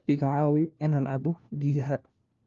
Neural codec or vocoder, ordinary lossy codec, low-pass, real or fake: codec, 16 kHz, 1 kbps, FunCodec, trained on LibriTTS, 50 frames a second; Opus, 32 kbps; 7.2 kHz; fake